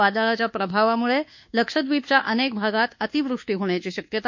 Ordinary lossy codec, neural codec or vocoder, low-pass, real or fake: MP3, 48 kbps; codec, 24 kHz, 1.2 kbps, DualCodec; 7.2 kHz; fake